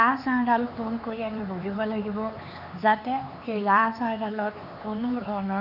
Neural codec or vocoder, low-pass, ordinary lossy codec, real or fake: codec, 16 kHz, 4 kbps, X-Codec, HuBERT features, trained on LibriSpeech; 5.4 kHz; AAC, 48 kbps; fake